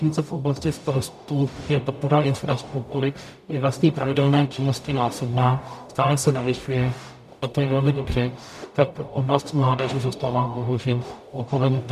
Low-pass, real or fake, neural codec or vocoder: 14.4 kHz; fake; codec, 44.1 kHz, 0.9 kbps, DAC